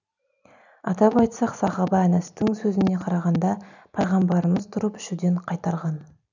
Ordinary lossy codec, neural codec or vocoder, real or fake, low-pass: none; none; real; 7.2 kHz